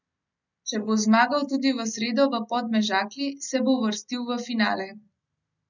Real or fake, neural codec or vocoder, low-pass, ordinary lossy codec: real; none; 7.2 kHz; none